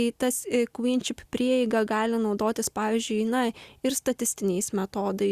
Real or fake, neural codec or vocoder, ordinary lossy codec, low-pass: real; none; Opus, 64 kbps; 14.4 kHz